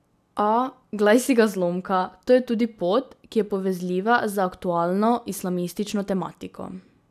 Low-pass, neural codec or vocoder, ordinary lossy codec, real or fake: 14.4 kHz; none; none; real